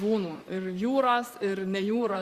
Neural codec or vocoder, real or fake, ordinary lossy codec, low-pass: vocoder, 44.1 kHz, 128 mel bands, Pupu-Vocoder; fake; Opus, 64 kbps; 14.4 kHz